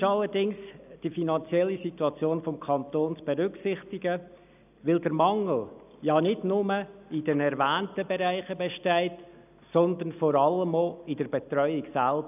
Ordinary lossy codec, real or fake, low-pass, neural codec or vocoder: none; real; 3.6 kHz; none